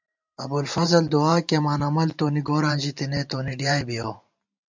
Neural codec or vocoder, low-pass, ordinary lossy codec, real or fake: vocoder, 44.1 kHz, 128 mel bands every 512 samples, BigVGAN v2; 7.2 kHz; MP3, 64 kbps; fake